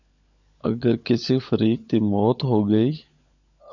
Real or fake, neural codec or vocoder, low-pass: fake; codec, 16 kHz, 16 kbps, FunCodec, trained on LibriTTS, 50 frames a second; 7.2 kHz